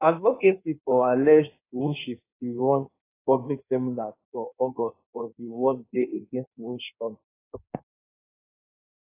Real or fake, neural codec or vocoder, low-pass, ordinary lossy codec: fake; codec, 16 kHz in and 24 kHz out, 1.1 kbps, FireRedTTS-2 codec; 3.6 kHz; AAC, 24 kbps